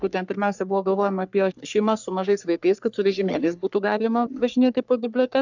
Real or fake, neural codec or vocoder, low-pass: fake; codec, 44.1 kHz, 3.4 kbps, Pupu-Codec; 7.2 kHz